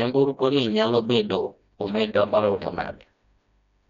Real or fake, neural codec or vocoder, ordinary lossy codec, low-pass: fake; codec, 16 kHz, 1 kbps, FreqCodec, smaller model; none; 7.2 kHz